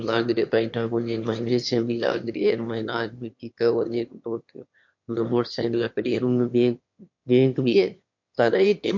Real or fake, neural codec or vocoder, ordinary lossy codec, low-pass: fake; autoencoder, 22.05 kHz, a latent of 192 numbers a frame, VITS, trained on one speaker; MP3, 48 kbps; 7.2 kHz